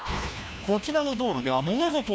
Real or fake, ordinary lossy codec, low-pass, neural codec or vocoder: fake; none; none; codec, 16 kHz, 1 kbps, FunCodec, trained on LibriTTS, 50 frames a second